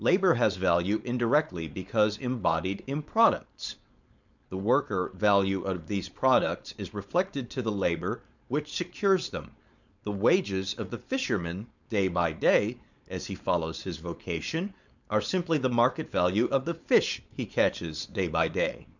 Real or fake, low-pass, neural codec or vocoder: fake; 7.2 kHz; codec, 16 kHz, 4.8 kbps, FACodec